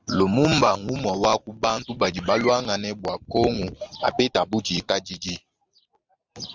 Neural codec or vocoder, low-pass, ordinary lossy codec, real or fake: none; 7.2 kHz; Opus, 32 kbps; real